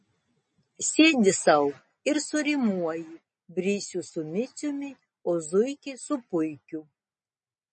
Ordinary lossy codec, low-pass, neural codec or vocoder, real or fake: MP3, 32 kbps; 10.8 kHz; none; real